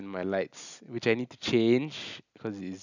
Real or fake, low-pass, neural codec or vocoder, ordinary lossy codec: real; 7.2 kHz; none; none